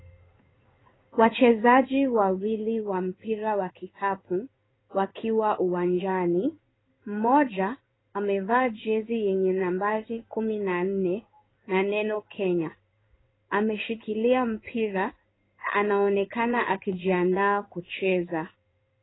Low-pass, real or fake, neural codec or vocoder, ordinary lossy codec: 7.2 kHz; fake; codec, 16 kHz in and 24 kHz out, 1 kbps, XY-Tokenizer; AAC, 16 kbps